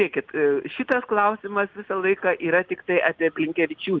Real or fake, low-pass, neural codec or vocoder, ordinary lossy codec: real; 7.2 kHz; none; Opus, 32 kbps